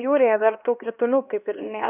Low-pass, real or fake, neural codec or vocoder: 3.6 kHz; fake; codec, 16 kHz, 2 kbps, X-Codec, HuBERT features, trained on LibriSpeech